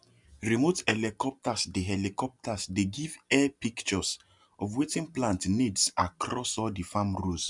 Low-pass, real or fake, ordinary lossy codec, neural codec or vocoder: 10.8 kHz; real; MP3, 96 kbps; none